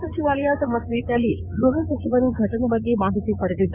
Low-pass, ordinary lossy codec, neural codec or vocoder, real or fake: 3.6 kHz; none; codec, 16 kHz in and 24 kHz out, 2.2 kbps, FireRedTTS-2 codec; fake